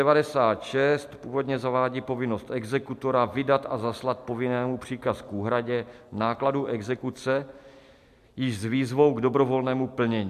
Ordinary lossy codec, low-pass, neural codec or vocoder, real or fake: MP3, 96 kbps; 14.4 kHz; none; real